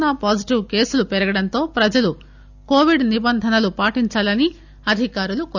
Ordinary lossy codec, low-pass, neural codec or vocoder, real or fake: none; 7.2 kHz; none; real